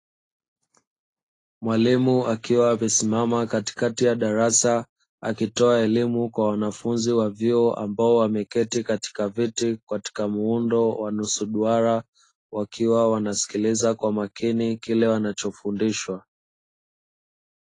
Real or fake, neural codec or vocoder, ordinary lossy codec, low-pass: real; none; AAC, 32 kbps; 10.8 kHz